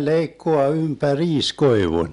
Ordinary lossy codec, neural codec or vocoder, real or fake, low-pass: none; none; real; 10.8 kHz